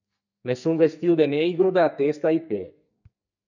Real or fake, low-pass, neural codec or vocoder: fake; 7.2 kHz; codec, 44.1 kHz, 2.6 kbps, SNAC